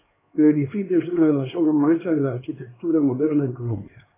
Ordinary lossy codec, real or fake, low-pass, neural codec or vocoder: AAC, 16 kbps; fake; 3.6 kHz; codec, 16 kHz, 2 kbps, X-Codec, HuBERT features, trained on LibriSpeech